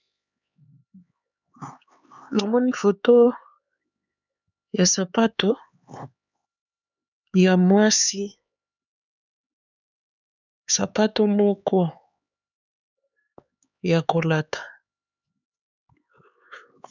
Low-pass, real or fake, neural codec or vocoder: 7.2 kHz; fake; codec, 16 kHz, 4 kbps, X-Codec, HuBERT features, trained on LibriSpeech